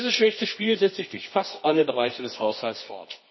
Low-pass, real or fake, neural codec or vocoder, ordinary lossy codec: 7.2 kHz; fake; codec, 24 kHz, 0.9 kbps, WavTokenizer, medium music audio release; MP3, 24 kbps